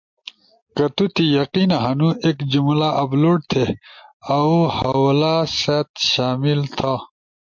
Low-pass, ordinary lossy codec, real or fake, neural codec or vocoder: 7.2 kHz; MP3, 48 kbps; real; none